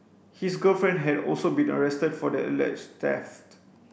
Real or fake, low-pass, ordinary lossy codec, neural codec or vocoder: real; none; none; none